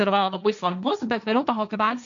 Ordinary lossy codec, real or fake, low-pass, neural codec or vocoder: MP3, 96 kbps; fake; 7.2 kHz; codec, 16 kHz, 1.1 kbps, Voila-Tokenizer